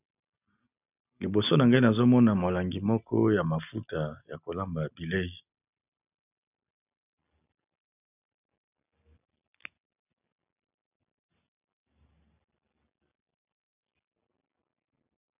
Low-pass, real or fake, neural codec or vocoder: 3.6 kHz; real; none